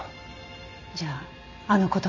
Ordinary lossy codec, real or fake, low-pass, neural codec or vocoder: MP3, 32 kbps; real; 7.2 kHz; none